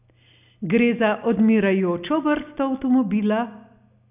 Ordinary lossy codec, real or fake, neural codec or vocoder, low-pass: none; real; none; 3.6 kHz